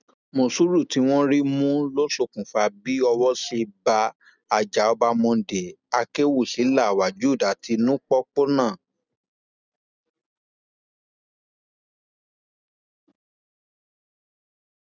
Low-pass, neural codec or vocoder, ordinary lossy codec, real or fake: 7.2 kHz; none; none; real